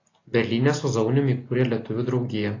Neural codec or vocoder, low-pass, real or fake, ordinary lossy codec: none; 7.2 kHz; real; AAC, 32 kbps